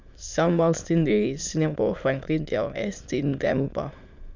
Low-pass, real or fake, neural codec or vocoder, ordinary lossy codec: 7.2 kHz; fake; autoencoder, 22.05 kHz, a latent of 192 numbers a frame, VITS, trained on many speakers; MP3, 64 kbps